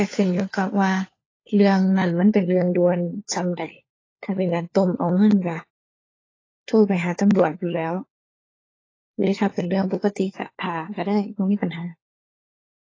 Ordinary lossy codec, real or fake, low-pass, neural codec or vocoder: AAC, 32 kbps; fake; 7.2 kHz; codec, 16 kHz, 4 kbps, FunCodec, trained on LibriTTS, 50 frames a second